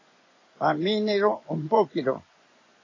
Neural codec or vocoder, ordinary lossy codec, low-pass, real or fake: vocoder, 24 kHz, 100 mel bands, Vocos; AAC, 32 kbps; 7.2 kHz; fake